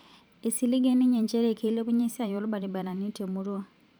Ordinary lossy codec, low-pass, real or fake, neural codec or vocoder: none; none; fake; vocoder, 44.1 kHz, 128 mel bands every 512 samples, BigVGAN v2